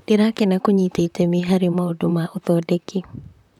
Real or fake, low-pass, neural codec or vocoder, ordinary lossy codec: fake; 19.8 kHz; vocoder, 44.1 kHz, 128 mel bands, Pupu-Vocoder; none